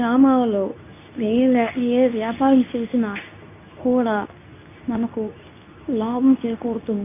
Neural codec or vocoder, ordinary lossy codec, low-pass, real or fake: codec, 24 kHz, 0.9 kbps, WavTokenizer, medium speech release version 2; none; 3.6 kHz; fake